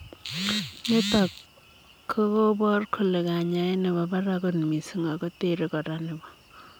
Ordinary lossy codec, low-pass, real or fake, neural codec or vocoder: none; none; real; none